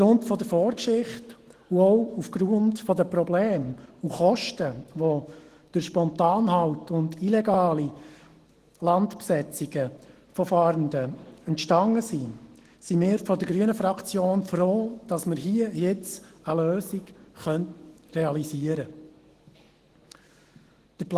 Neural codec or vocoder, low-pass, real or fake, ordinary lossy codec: autoencoder, 48 kHz, 128 numbers a frame, DAC-VAE, trained on Japanese speech; 14.4 kHz; fake; Opus, 16 kbps